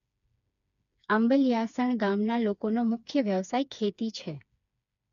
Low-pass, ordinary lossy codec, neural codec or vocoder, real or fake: 7.2 kHz; none; codec, 16 kHz, 4 kbps, FreqCodec, smaller model; fake